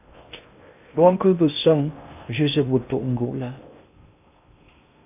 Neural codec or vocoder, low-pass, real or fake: codec, 16 kHz in and 24 kHz out, 0.6 kbps, FocalCodec, streaming, 2048 codes; 3.6 kHz; fake